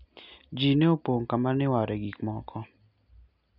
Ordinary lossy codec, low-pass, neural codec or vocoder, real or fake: none; 5.4 kHz; none; real